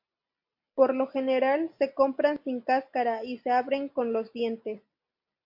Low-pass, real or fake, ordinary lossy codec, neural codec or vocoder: 5.4 kHz; real; MP3, 48 kbps; none